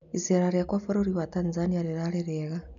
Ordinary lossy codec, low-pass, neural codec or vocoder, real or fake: none; 7.2 kHz; none; real